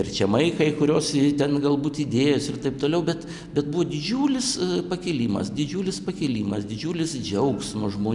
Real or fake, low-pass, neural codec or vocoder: real; 10.8 kHz; none